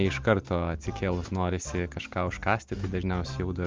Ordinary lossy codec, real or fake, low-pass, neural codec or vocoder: Opus, 24 kbps; real; 7.2 kHz; none